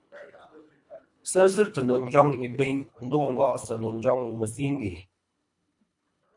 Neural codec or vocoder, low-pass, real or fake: codec, 24 kHz, 1.5 kbps, HILCodec; 10.8 kHz; fake